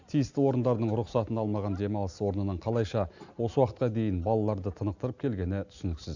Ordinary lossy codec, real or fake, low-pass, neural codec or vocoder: none; real; 7.2 kHz; none